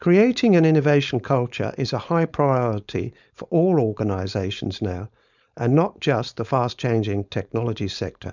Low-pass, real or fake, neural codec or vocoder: 7.2 kHz; fake; codec, 16 kHz, 4.8 kbps, FACodec